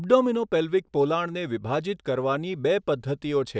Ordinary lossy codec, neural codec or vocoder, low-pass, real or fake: none; none; none; real